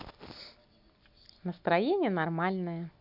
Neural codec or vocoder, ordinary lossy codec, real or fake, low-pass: none; none; real; 5.4 kHz